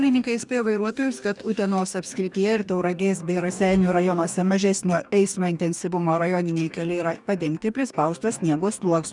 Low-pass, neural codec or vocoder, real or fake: 10.8 kHz; codec, 44.1 kHz, 2.6 kbps, DAC; fake